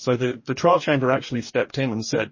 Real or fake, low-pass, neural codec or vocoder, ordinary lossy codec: fake; 7.2 kHz; codec, 44.1 kHz, 2.6 kbps, DAC; MP3, 32 kbps